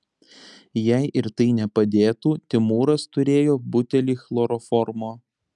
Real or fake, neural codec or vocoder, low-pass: real; none; 10.8 kHz